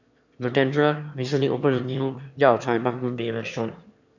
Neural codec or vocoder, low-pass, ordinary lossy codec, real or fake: autoencoder, 22.05 kHz, a latent of 192 numbers a frame, VITS, trained on one speaker; 7.2 kHz; none; fake